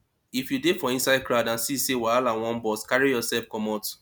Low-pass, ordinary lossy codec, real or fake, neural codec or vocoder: none; none; real; none